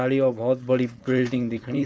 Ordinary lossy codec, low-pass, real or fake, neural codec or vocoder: none; none; fake; codec, 16 kHz, 4.8 kbps, FACodec